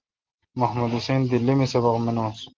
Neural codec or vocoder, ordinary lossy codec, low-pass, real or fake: none; Opus, 16 kbps; 7.2 kHz; real